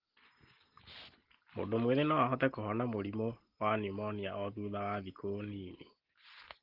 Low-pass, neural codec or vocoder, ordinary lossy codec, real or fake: 5.4 kHz; none; Opus, 16 kbps; real